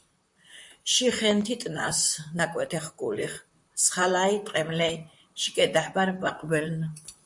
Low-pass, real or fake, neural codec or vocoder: 10.8 kHz; fake; vocoder, 44.1 kHz, 128 mel bands, Pupu-Vocoder